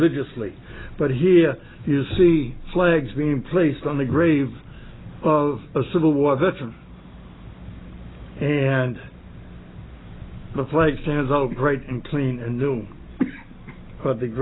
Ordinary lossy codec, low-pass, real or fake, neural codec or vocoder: AAC, 16 kbps; 7.2 kHz; real; none